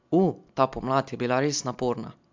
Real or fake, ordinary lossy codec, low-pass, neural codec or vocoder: real; MP3, 64 kbps; 7.2 kHz; none